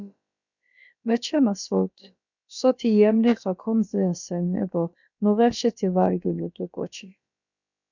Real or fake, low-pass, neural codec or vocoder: fake; 7.2 kHz; codec, 16 kHz, about 1 kbps, DyCAST, with the encoder's durations